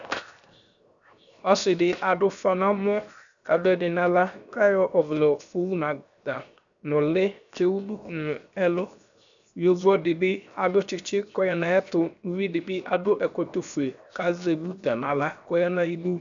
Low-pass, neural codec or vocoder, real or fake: 7.2 kHz; codec, 16 kHz, 0.7 kbps, FocalCodec; fake